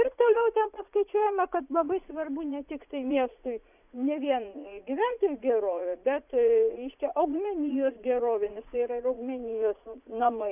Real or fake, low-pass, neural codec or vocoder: fake; 3.6 kHz; vocoder, 44.1 kHz, 80 mel bands, Vocos